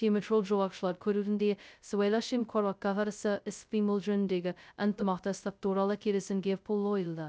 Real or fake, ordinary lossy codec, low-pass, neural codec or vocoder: fake; none; none; codec, 16 kHz, 0.2 kbps, FocalCodec